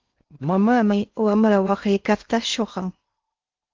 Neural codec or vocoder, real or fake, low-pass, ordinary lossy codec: codec, 16 kHz in and 24 kHz out, 0.6 kbps, FocalCodec, streaming, 4096 codes; fake; 7.2 kHz; Opus, 32 kbps